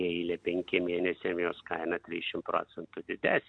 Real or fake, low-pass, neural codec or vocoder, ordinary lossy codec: real; 9.9 kHz; none; MP3, 64 kbps